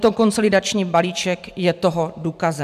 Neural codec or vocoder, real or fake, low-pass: vocoder, 44.1 kHz, 128 mel bands every 256 samples, BigVGAN v2; fake; 14.4 kHz